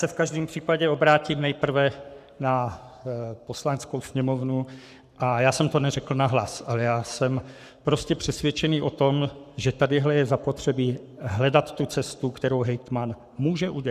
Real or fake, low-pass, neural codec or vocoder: fake; 14.4 kHz; codec, 44.1 kHz, 7.8 kbps, Pupu-Codec